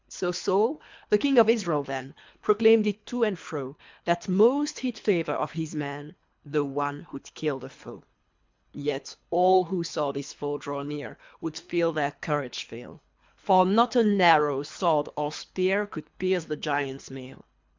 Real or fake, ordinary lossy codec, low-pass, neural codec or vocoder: fake; MP3, 64 kbps; 7.2 kHz; codec, 24 kHz, 3 kbps, HILCodec